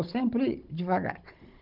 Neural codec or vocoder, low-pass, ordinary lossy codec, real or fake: codec, 16 kHz, 16 kbps, FunCodec, trained on Chinese and English, 50 frames a second; 5.4 kHz; Opus, 32 kbps; fake